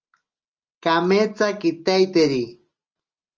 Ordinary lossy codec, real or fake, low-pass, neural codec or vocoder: Opus, 24 kbps; real; 7.2 kHz; none